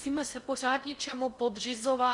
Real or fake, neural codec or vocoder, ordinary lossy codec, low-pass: fake; codec, 16 kHz in and 24 kHz out, 0.6 kbps, FocalCodec, streaming, 4096 codes; Opus, 64 kbps; 10.8 kHz